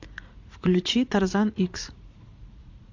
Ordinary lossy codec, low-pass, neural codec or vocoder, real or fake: AAC, 48 kbps; 7.2 kHz; vocoder, 44.1 kHz, 80 mel bands, Vocos; fake